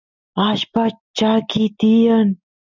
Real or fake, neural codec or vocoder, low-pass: real; none; 7.2 kHz